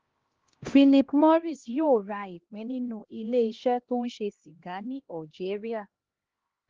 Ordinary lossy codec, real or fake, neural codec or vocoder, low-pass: Opus, 16 kbps; fake; codec, 16 kHz, 1 kbps, X-Codec, HuBERT features, trained on LibriSpeech; 7.2 kHz